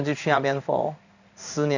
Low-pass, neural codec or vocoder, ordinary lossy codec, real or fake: 7.2 kHz; codec, 16 kHz in and 24 kHz out, 1 kbps, XY-Tokenizer; none; fake